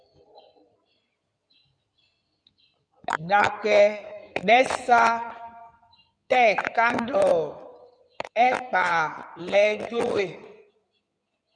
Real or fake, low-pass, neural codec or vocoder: fake; 9.9 kHz; codec, 16 kHz in and 24 kHz out, 2.2 kbps, FireRedTTS-2 codec